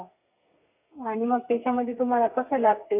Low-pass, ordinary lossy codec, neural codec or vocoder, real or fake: 3.6 kHz; none; codec, 32 kHz, 1.9 kbps, SNAC; fake